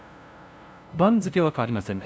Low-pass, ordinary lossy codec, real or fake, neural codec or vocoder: none; none; fake; codec, 16 kHz, 0.5 kbps, FunCodec, trained on LibriTTS, 25 frames a second